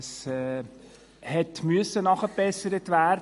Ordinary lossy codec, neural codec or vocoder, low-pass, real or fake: MP3, 48 kbps; none; 14.4 kHz; real